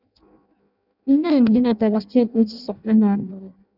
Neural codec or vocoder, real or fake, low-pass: codec, 16 kHz in and 24 kHz out, 0.6 kbps, FireRedTTS-2 codec; fake; 5.4 kHz